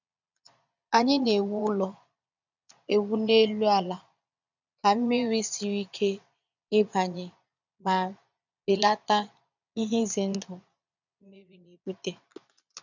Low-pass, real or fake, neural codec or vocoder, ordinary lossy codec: 7.2 kHz; fake; vocoder, 22.05 kHz, 80 mel bands, Vocos; none